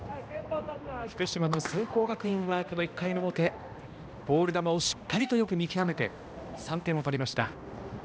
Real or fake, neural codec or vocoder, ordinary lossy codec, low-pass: fake; codec, 16 kHz, 1 kbps, X-Codec, HuBERT features, trained on balanced general audio; none; none